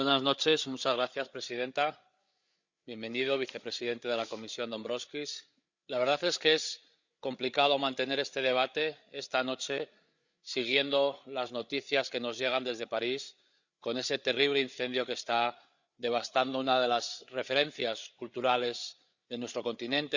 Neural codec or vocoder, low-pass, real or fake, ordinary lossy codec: codec, 16 kHz, 8 kbps, FreqCodec, larger model; 7.2 kHz; fake; Opus, 64 kbps